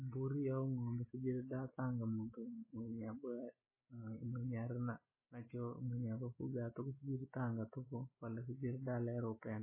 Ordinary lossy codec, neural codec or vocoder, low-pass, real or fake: MP3, 16 kbps; none; 3.6 kHz; real